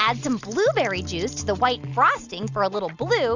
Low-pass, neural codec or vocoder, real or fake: 7.2 kHz; none; real